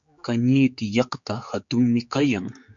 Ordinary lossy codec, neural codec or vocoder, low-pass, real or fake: MP3, 48 kbps; codec, 16 kHz, 4 kbps, X-Codec, HuBERT features, trained on general audio; 7.2 kHz; fake